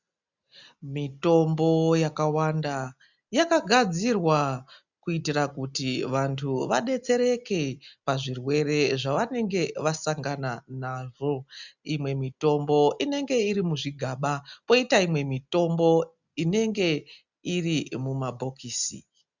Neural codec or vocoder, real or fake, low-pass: none; real; 7.2 kHz